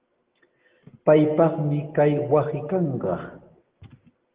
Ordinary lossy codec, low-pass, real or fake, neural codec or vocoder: Opus, 16 kbps; 3.6 kHz; real; none